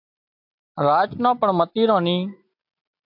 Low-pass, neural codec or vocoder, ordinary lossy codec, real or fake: 5.4 kHz; none; AAC, 48 kbps; real